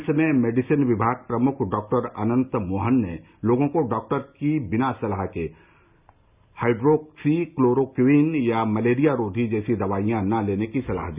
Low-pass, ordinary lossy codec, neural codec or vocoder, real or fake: 3.6 kHz; Opus, 64 kbps; none; real